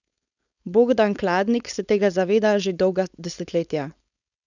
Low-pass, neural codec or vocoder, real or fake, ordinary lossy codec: 7.2 kHz; codec, 16 kHz, 4.8 kbps, FACodec; fake; none